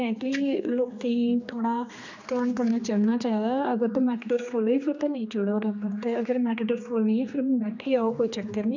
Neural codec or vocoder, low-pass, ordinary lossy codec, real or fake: codec, 16 kHz, 2 kbps, X-Codec, HuBERT features, trained on general audio; 7.2 kHz; none; fake